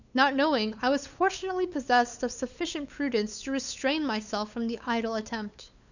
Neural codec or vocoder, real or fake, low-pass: codec, 16 kHz, 8 kbps, FunCodec, trained on LibriTTS, 25 frames a second; fake; 7.2 kHz